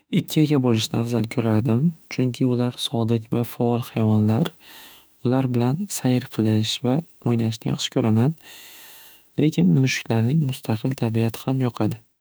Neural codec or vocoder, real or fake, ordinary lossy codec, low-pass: autoencoder, 48 kHz, 32 numbers a frame, DAC-VAE, trained on Japanese speech; fake; none; none